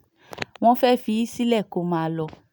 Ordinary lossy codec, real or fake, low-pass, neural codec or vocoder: none; real; none; none